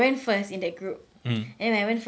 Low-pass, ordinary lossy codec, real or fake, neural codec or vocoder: none; none; real; none